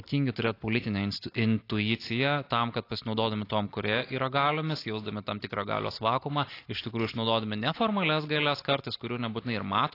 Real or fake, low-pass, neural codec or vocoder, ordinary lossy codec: real; 5.4 kHz; none; AAC, 32 kbps